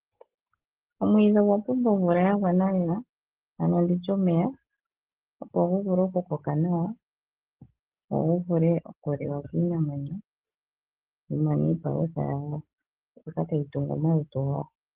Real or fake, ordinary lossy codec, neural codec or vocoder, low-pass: real; Opus, 16 kbps; none; 3.6 kHz